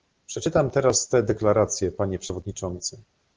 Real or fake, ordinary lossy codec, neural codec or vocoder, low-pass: real; Opus, 16 kbps; none; 7.2 kHz